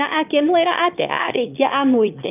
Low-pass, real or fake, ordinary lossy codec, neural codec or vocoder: 3.6 kHz; fake; none; autoencoder, 22.05 kHz, a latent of 192 numbers a frame, VITS, trained on one speaker